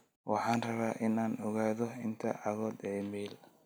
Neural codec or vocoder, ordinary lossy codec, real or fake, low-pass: none; none; real; none